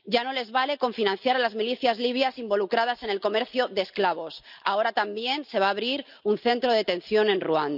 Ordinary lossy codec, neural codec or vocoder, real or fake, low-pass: none; none; real; 5.4 kHz